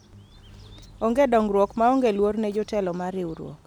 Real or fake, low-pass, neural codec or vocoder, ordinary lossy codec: real; 19.8 kHz; none; none